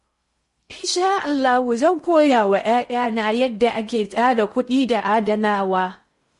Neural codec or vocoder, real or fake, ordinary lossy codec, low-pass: codec, 16 kHz in and 24 kHz out, 0.6 kbps, FocalCodec, streaming, 2048 codes; fake; MP3, 48 kbps; 10.8 kHz